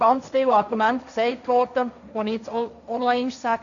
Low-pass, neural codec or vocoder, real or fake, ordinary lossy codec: 7.2 kHz; codec, 16 kHz, 1.1 kbps, Voila-Tokenizer; fake; none